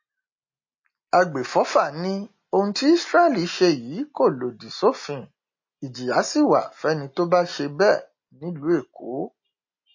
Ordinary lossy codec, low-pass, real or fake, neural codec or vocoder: MP3, 32 kbps; 7.2 kHz; real; none